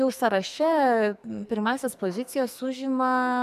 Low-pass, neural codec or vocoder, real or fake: 14.4 kHz; codec, 44.1 kHz, 2.6 kbps, SNAC; fake